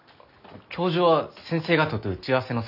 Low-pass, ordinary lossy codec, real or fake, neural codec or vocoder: 5.4 kHz; none; real; none